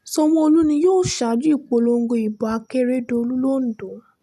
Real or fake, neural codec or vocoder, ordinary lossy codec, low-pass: real; none; none; 14.4 kHz